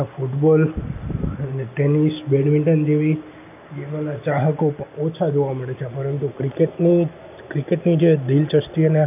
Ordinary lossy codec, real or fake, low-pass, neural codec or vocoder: none; real; 3.6 kHz; none